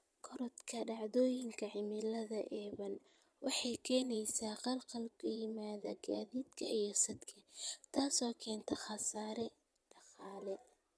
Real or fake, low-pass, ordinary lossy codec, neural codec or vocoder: fake; none; none; vocoder, 22.05 kHz, 80 mel bands, Vocos